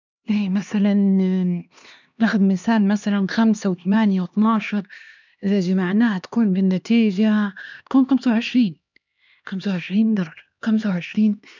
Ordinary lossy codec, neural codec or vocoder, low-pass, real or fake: none; codec, 16 kHz, 2 kbps, X-Codec, HuBERT features, trained on LibriSpeech; 7.2 kHz; fake